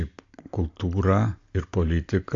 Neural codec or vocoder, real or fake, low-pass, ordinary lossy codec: none; real; 7.2 kHz; AAC, 48 kbps